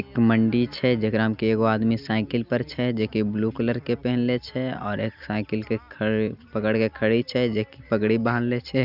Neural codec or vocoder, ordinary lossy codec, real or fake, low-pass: none; none; real; 5.4 kHz